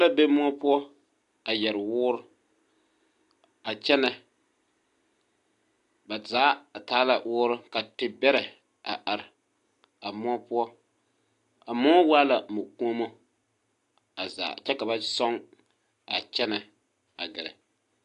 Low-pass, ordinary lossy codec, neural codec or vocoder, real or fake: 10.8 kHz; AAC, 64 kbps; none; real